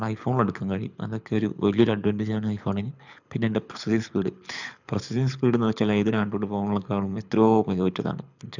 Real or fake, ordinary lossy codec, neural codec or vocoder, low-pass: fake; none; codec, 24 kHz, 6 kbps, HILCodec; 7.2 kHz